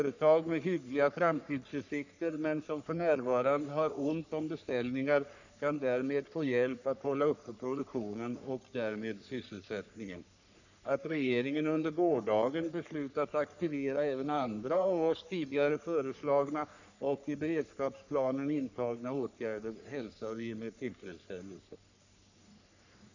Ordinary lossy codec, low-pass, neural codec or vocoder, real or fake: none; 7.2 kHz; codec, 44.1 kHz, 3.4 kbps, Pupu-Codec; fake